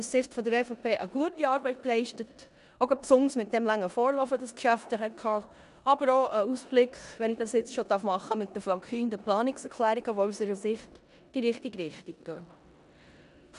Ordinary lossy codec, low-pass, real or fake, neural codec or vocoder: MP3, 96 kbps; 10.8 kHz; fake; codec, 16 kHz in and 24 kHz out, 0.9 kbps, LongCat-Audio-Codec, four codebook decoder